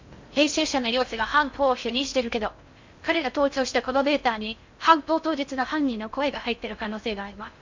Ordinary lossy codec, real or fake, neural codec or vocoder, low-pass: MP3, 64 kbps; fake; codec, 16 kHz in and 24 kHz out, 0.6 kbps, FocalCodec, streaming, 4096 codes; 7.2 kHz